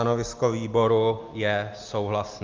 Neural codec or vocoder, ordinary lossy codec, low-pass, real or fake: none; Opus, 24 kbps; 7.2 kHz; real